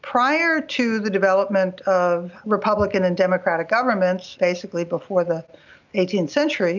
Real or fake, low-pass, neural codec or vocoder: real; 7.2 kHz; none